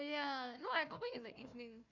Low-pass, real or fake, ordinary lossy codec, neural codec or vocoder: 7.2 kHz; fake; none; codec, 16 kHz, 1 kbps, FunCodec, trained on Chinese and English, 50 frames a second